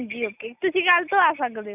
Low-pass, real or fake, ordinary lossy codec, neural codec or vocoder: 3.6 kHz; real; none; none